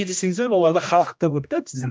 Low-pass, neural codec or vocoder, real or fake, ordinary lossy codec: none; codec, 16 kHz, 1 kbps, X-Codec, HuBERT features, trained on general audio; fake; none